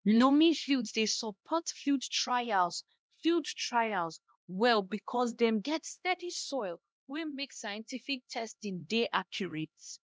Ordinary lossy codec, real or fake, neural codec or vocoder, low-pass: none; fake; codec, 16 kHz, 1 kbps, X-Codec, HuBERT features, trained on LibriSpeech; none